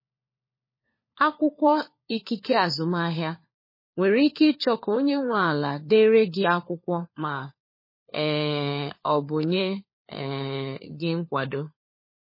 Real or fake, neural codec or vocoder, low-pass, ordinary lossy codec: fake; codec, 16 kHz, 4 kbps, FunCodec, trained on LibriTTS, 50 frames a second; 5.4 kHz; MP3, 24 kbps